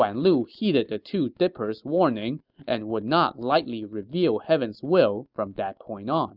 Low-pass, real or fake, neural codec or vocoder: 5.4 kHz; real; none